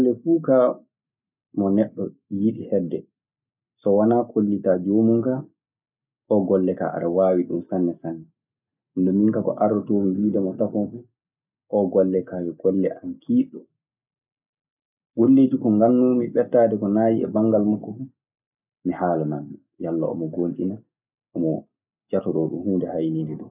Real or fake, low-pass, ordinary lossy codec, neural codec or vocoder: real; 3.6 kHz; none; none